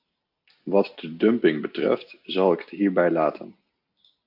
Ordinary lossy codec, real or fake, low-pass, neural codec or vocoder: AAC, 48 kbps; real; 5.4 kHz; none